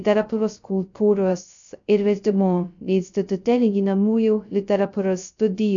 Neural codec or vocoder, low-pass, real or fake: codec, 16 kHz, 0.2 kbps, FocalCodec; 7.2 kHz; fake